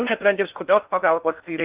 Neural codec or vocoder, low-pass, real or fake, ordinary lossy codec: codec, 16 kHz in and 24 kHz out, 0.6 kbps, FocalCodec, streaming, 4096 codes; 3.6 kHz; fake; Opus, 24 kbps